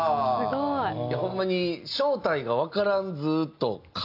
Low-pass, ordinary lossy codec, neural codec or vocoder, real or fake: 5.4 kHz; AAC, 48 kbps; none; real